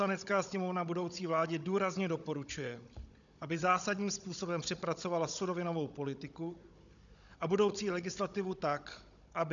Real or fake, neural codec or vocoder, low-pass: fake; codec, 16 kHz, 16 kbps, FunCodec, trained on Chinese and English, 50 frames a second; 7.2 kHz